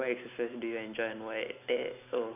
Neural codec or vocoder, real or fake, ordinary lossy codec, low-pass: none; real; none; 3.6 kHz